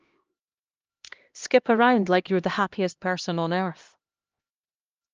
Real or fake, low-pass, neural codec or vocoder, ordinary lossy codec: fake; 7.2 kHz; codec, 16 kHz, 1 kbps, X-Codec, HuBERT features, trained on LibriSpeech; Opus, 24 kbps